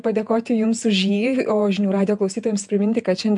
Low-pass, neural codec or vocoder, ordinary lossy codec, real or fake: 10.8 kHz; vocoder, 48 kHz, 128 mel bands, Vocos; MP3, 96 kbps; fake